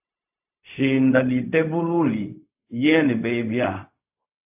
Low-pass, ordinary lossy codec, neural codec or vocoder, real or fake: 3.6 kHz; AAC, 32 kbps; codec, 16 kHz, 0.4 kbps, LongCat-Audio-Codec; fake